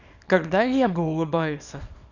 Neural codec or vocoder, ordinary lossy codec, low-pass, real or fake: codec, 24 kHz, 0.9 kbps, WavTokenizer, small release; none; 7.2 kHz; fake